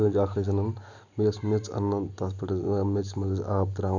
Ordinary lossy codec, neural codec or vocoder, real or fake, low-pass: none; none; real; 7.2 kHz